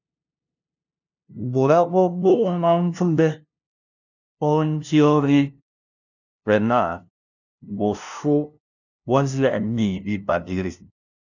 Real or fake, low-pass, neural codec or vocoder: fake; 7.2 kHz; codec, 16 kHz, 0.5 kbps, FunCodec, trained on LibriTTS, 25 frames a second